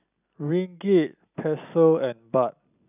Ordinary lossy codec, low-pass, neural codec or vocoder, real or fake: none; 3.6 kHz; none; real